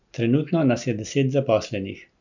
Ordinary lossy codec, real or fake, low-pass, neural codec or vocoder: none; real; 7.2 kHz; none